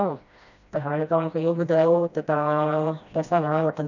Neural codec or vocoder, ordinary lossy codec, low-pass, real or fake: codec, 16 kHz, 1 kbps, FreqCodec, smaller model; none; 7.2 kHz; fake